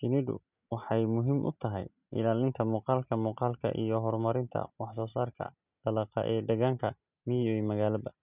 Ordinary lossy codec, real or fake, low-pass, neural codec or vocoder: none; real; 3.6 kHz; none